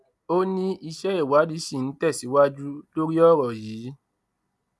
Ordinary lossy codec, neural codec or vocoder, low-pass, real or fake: none; none; none; real